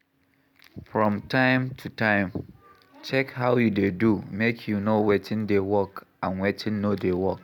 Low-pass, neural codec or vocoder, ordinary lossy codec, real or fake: 19.8 kHz; none; none; real